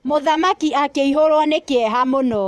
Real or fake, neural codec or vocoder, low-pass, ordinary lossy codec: fake; vocoder, 24 kHz, 100 mel bands, Vocos; none; none